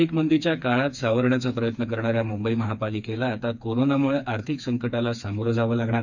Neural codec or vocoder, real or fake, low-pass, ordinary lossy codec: codec, 16 kHz, 4 kbps, FreqCodec, smaller model; fake; 7.2 kHz; none